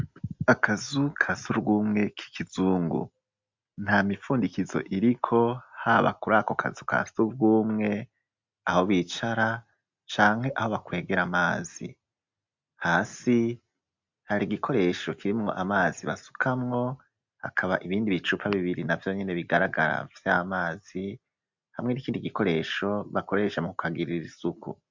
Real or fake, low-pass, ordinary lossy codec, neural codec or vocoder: real; 7.2 kHz; MP3, 64 kbps; none